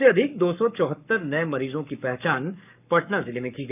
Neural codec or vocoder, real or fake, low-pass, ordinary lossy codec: codec, 44.1 kHz, 7.8 kbps, Pupu-Codec; fake; 3.6 kHz; none